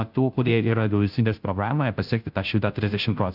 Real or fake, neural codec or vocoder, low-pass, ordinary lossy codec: fake; codec, 16 kHz, 0.5 kbps, FunCodec, trained on Chinese and English, 25 frames a second; 5.4 kHz; AAC, 48 kbps